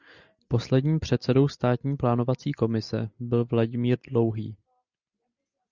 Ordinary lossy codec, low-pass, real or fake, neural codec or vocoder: Opus, 64 kbps; 7.2 kHz; real; none